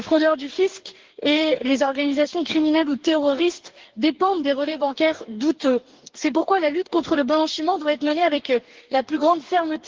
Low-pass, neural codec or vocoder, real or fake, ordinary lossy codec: 7.2 kHz; codec, 44.1 kHz, 2.6 kbps, DAC; fake; Opus, 16 kbps